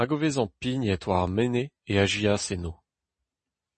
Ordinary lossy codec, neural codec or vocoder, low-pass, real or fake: MP3, 32 kbps; none; 9.9 kHz; real